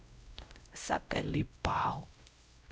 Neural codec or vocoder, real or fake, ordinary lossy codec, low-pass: codec, 16 kHz, 0.5 kbps, X-Codec, WavLM features, trained on Multilingual LibriSpeech; fake; none; none